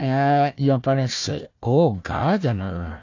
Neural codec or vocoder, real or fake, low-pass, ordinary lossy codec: codec, 16 kHz, 1 kbps, FunCodec, trained on Chinese and English, 50 frames a second; fake; 7.2 kHz; AAC, 48 kbps